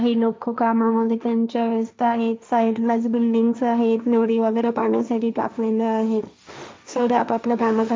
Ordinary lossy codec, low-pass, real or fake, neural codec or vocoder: none; none; fake; codec, 16 kHz, 1.1 kbps, Voila-Tokenizer